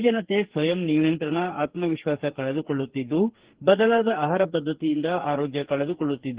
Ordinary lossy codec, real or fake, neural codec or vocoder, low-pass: Opus, 16 kbps; fake; codec, 44.1 kHz, 2.6 kbps, SNAC; 3.6 kHz